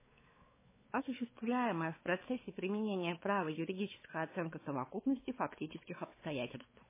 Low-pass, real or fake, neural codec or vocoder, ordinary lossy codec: 3.6 kHz; fake; codec, 16 kHz, 4 kbps, X-Codec, WavLM features, trained on Multilingual LibriSpeech; MP3, 16 kbps